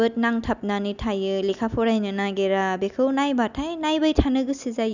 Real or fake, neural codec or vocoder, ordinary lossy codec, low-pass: real; none; none; 7.2 kHz